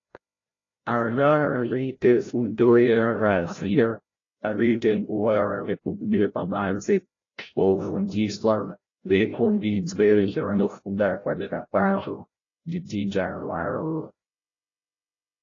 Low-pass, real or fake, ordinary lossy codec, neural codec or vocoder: 7.2 kHz; fake; AAC, 32 kbps; codec, 16 kHz, 0.5 kbps, FreqCodec, larger model